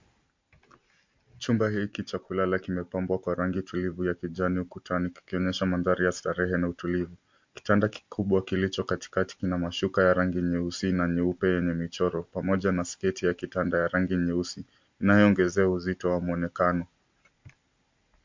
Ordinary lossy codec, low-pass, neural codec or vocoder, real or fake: MP3, 48 kbps; 7.2 kHz; none; real